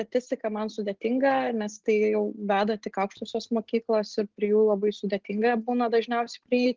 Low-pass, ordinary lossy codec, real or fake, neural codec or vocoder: 7.2 kHz; Opus, 16 kbps; real; none